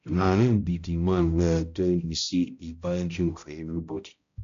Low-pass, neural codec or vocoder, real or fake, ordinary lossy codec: 7.2 kHz; codec, 16 kHz, 0.5 kbps, X-Codec, HuBERT features, trained on balanced general audio; fake; AAC, 64 kbps